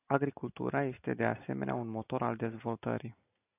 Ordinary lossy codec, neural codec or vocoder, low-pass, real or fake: AAC, 24 kbps; none; 3.6 kHz; real